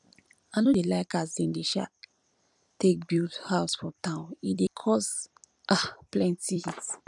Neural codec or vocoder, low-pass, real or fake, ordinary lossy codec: vocoder, 44.1 kHz, 128 mel bands every 256 samples, BigVGAN v2; 10.8 kHz; fake; none